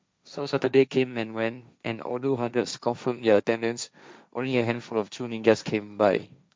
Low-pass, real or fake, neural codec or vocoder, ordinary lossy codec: none; fake; codec, 16 kHz, 1.1 kbps, Voila-Tokenizer; none